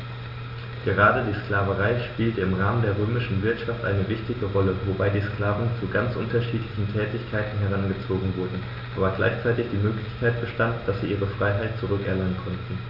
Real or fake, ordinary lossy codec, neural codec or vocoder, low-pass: real; none; none; 5.4 kHz